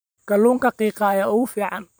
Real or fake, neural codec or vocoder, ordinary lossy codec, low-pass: fake; vocoder, 44.1 kHz, 128 mel bands every 512 samples, BigVGAN v2; none; none